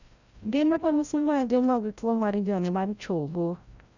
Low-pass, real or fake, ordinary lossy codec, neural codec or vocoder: 7.2 kHz; fake; Opus, 64 kbps; codec, 16 kHz, 0.5 kbps, FreqCodec, larger model